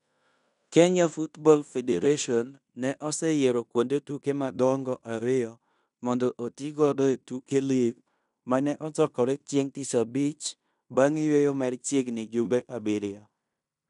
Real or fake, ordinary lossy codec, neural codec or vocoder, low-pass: fake; none; codec, 16 kHz in and 24 kHz out, 0.9 kbps, LongCat-Audio-Codec, fine tuned four codebook decoder; 10.8 kHz